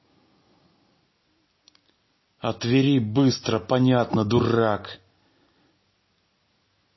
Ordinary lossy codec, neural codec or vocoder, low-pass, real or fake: MP3, 24 kbps; none; 7.2 kHz; real